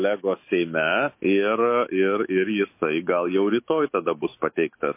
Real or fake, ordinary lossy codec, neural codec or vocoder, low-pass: fake; MP3, 24 kbps; autoencoder, 48 kHz, 128 numbers a frame, DAC-VAE, trained on Japanese speech; 3.6 kHz